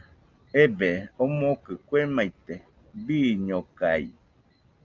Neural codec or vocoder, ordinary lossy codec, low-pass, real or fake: none; Opus, 24 kbps; 7.2 kHz; real